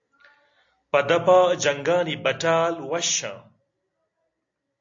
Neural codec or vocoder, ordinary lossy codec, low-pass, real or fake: none; AAC, 48 kbps; 7.2 kHz; real